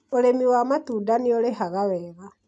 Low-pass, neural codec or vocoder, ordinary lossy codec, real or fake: none; none; none; real